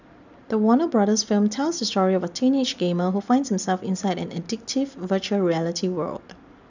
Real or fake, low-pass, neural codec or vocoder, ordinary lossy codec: real; 7.2 kHz; none; none